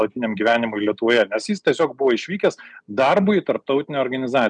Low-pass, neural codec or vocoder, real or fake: 9.9 kHz; none; real